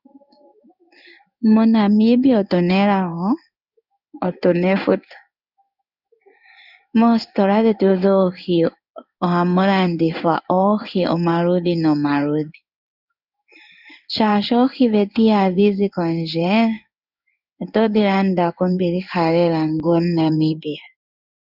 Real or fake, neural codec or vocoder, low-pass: fake; codec, 16 kHz in and 24 kHz out, 1 kbps, XY-Tokenizer; 5.4 kHz